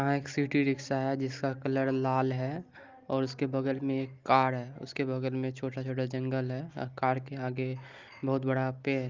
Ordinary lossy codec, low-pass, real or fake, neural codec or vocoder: Opus, 24 kbps; 7.2 kHz; real; none